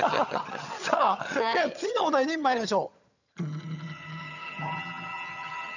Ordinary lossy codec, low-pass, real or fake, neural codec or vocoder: none; 7.2 kHz; fake; vocoder, 22.05 kHz, 80 mel bands, HiFi-GAN